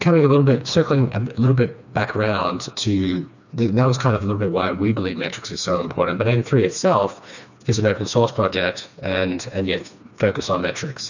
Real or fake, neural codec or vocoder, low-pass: fake; codec, 16 kHz, 2 kbps, FreqCodec, smaller model; 7.2 kHz